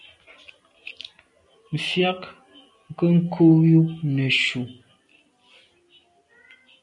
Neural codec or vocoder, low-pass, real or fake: none; 10.8 kHz; real